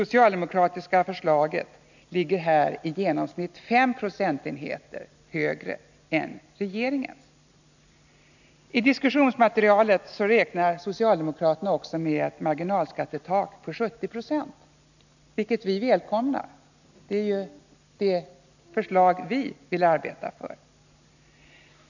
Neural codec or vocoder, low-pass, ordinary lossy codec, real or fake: none; 7.2 kHz; none; real